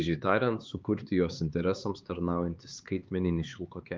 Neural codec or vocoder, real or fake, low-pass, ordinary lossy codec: codec, 16 kHz, 4 kbps, X-Codec, HuBERT features, trained on LibriSpeech; fake; 7.2 kHz; Opus, 32 kbps